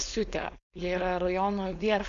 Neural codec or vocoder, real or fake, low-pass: codec, 16 kHz, 4.8 kbps, FACodec; fake; 7.2 kHz